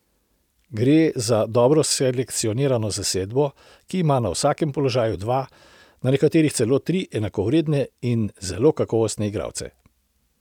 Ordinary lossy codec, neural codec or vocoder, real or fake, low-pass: none; none; real; 19.8 kHz